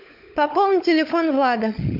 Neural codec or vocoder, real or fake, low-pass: codec, 16 kHz, 16 kbps, FunCodec, trained on LibriTTS, 50 frames a second; fake; 5.4 kHz